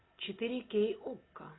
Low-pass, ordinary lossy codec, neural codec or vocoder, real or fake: 7.2 kHz; AAC, 16 kbps; none; real